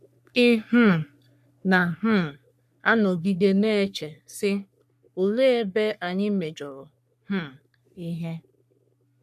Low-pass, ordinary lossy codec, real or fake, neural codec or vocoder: 14.4 kHz; none; fake; codec, 44.1 kHz, 3.4 kbps, Pupu-Codec